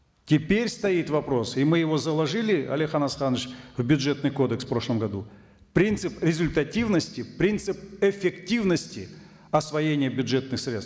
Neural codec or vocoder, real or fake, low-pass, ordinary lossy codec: none; real; none; none